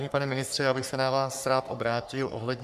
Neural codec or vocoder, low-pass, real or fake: codec, 44.1 kHz, 3.4 kbps, Pupu-Codec; 14.4 kHz; fake